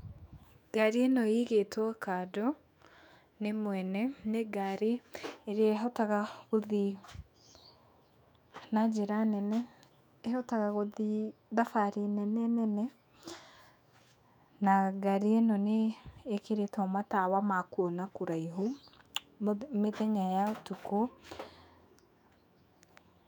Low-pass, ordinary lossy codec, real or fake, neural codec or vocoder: 19.8 kHz; none; fake; autoencoder, 48 kHz, 128 numbers a frame, DAC-VAE, trained on Japanese speech